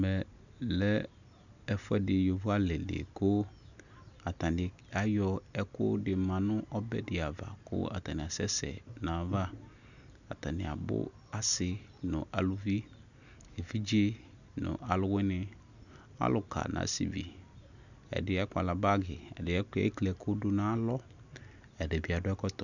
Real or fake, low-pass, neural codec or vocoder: real; 7.2 kHz; none